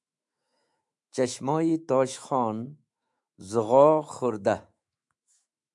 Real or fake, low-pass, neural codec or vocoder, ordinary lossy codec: fake; 10.8 kHz; autoencoder, 48 kHz, 128 numbers a frame, DAC-VAE, trained on Japanese speech; MP3, 96 kbps